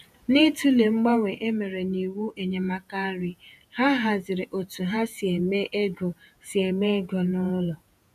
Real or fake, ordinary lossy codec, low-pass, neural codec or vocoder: fake; none; 14.4 kHz; vocoder, 48 kHz, 128 mel bands, Vocos